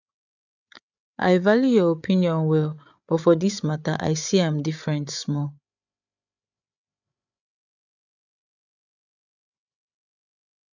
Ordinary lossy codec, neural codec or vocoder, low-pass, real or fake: none; codec, 16 kHz, 8 kbps, FreqCodec, larger model; 7.2 kHz; fake